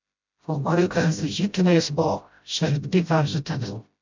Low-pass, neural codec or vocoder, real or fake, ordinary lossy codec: 7.2 kHz; codec, 16 kHz, 0.5 kbps, FreqCodec, smaller model; fake; MP3, 64 kbps